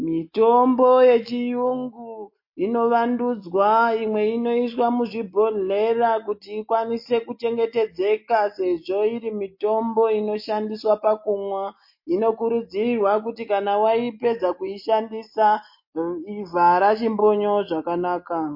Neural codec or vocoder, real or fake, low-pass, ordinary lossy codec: none; real; 5.4 kHz; MP3, 32 kbps